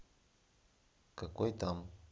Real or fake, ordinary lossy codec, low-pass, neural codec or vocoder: real; none; none; none